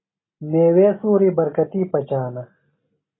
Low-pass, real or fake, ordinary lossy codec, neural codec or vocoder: 7.2 kHz; real; AAC, 16 kbps; none